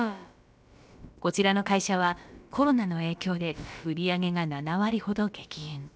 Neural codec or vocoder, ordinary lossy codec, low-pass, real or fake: codec, 16 kHz, about 1 kbps, DyCAST, with the encoder's durations; none; none; fake